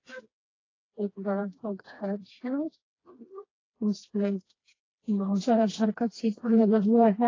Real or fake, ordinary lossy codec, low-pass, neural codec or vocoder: fake; AAC, 32 kbps; 7.2 kHz; codec, 16 kHz, 2 kbps, FreqCodec, smaller model